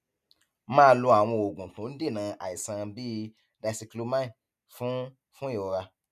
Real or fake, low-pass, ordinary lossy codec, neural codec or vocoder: real; 14.4 kHz; none; none